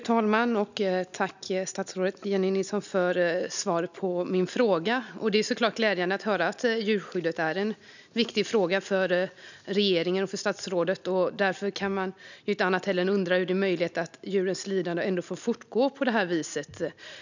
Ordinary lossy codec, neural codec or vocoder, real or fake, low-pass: none; none; real; 7.2 kHz